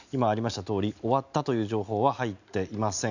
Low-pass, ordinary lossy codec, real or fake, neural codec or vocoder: 7.2 kHz; none; real; none